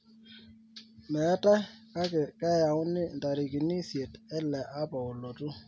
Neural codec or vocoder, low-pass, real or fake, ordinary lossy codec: none; none; real; none